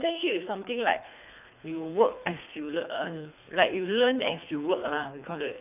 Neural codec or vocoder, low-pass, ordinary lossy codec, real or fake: codec, 24 kHz, 3 kbps, HILCodec; 3.6 kHz; none; fake